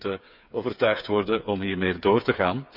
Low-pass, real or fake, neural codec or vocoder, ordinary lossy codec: 5.4 kHz; fake; codec, 16 kHz, 8 kbps, FreqCodec, smaller model; none